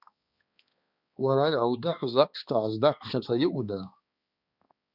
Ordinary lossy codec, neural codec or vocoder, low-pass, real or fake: Opus, 64 kbps; codec, 16 kHz, 2 kbps, X-Codec, HuBERT features, trained on balanced general audio; 5.4 kHz; fake